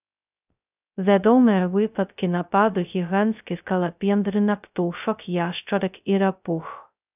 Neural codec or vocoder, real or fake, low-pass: codec, 16 kHz, 0.2 kbps, FocalCodec; fake; 3.6 kHz